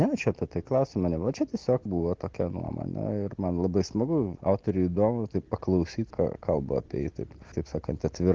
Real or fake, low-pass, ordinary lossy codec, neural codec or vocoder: real; 7.2 kHz; Opus, 16 kbps; none